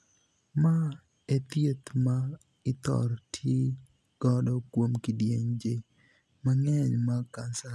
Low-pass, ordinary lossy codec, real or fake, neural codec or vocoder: none; none; real; none